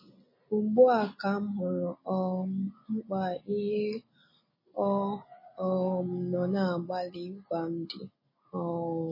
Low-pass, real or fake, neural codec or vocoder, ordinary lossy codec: 5.4 kHz; real; none; MP3, 24 kbps